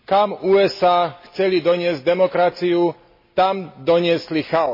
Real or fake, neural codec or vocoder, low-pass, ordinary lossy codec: real; none; 5.4 kHz; MP3, 24 kbps